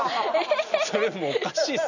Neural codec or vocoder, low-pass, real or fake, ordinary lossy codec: none; 7.2 kHz; real; none